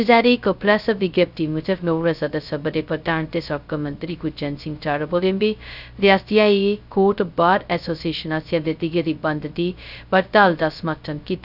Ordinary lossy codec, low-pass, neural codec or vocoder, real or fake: none; 5.4 kHz; codec, 16 kHz, 0.2 kbps, FocalCodec; fake